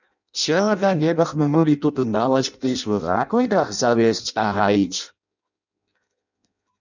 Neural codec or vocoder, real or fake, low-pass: codec, 16 kHz in and 24 kHz out, 0.6 kbps, FireRedTTS-2 codec; fake; 7.2 kHz